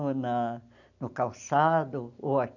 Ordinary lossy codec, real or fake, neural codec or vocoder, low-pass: none; real; none; 7.2 kHz